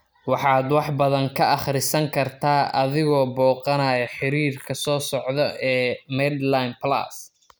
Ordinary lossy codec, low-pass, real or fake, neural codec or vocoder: none; none; real; none